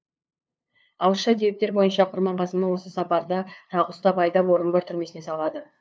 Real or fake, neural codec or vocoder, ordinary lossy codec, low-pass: fake; codec, 16 kHz, 2 kbps, FunCodec, trained on LibriTTS, 25 frames a second; none; none